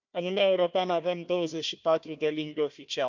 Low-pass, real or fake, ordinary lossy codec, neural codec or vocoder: 7.2 kHz; fake; none; codec, 16 kHz, 1 kbps, FunCodec, trained on Chinese and English, 50 frames a second